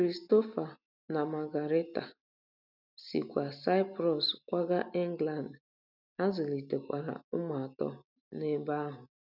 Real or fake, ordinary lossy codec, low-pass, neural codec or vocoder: real; none; 5.4 kHz; none